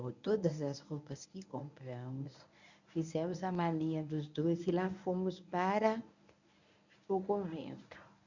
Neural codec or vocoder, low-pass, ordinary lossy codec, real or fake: codec, 24 kHz, 0.9 kbps, WavTokenizer, medium speech release version 1; 7.2 kHz; none; fake